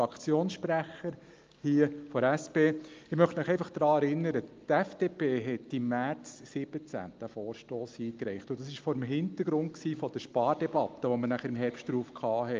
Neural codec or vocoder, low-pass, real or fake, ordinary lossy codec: none; 7.2 kHz; real; Opus, 24 kbps